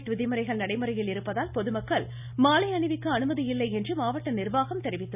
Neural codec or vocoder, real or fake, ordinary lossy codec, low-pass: none; real; none; 3.6 kHz